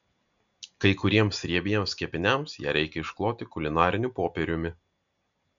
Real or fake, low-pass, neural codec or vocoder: real; 7.2 kHz; none